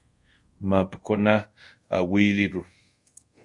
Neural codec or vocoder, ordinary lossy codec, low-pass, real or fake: codec, 24 kHz, 0.5 kbps, DualCodec; MP3, 48 kbps; 10.8 kHz; fake